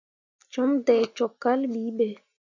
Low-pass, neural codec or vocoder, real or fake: 7.2 kHz; none; real